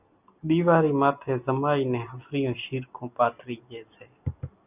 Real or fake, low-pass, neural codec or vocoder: real; 3.6 kHz; none